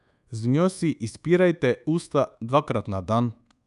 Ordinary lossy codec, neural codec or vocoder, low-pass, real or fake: none; codec, 24 kHz, 1.2 kbps, DualCodec; 10.8 kHz; fake